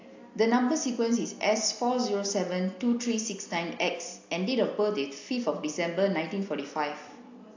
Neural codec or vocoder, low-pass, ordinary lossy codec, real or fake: none; 7.2 kHz; AAC, 48 kbps; real